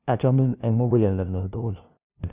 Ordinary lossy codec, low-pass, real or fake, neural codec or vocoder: Opus, 64 kbps; 3.6 kHz; fake; codec, 16 kHz, 0.5 kbps, FunCodec, trained on LibriTTS, 25 frames a second